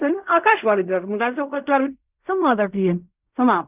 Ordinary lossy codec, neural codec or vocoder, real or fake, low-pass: none; codec, 16 kHz in and 24 kHz out, 0.4 kbps, LongCat-Audio-Codec, fine tuned four codebook decoder; fake; 3.6 kHz